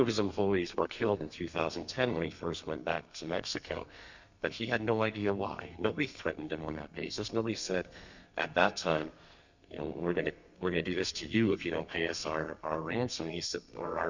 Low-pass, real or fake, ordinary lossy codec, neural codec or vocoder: 7.2 kHz; fake; Opus, 64 kbps; codec, 32 kHz, 1.9 kbps, SNAC